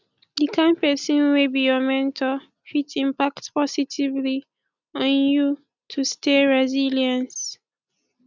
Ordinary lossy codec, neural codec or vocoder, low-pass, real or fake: none; none; 7.2 kHz; real